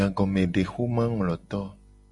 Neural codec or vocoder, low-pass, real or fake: none; 10.8 kHz; real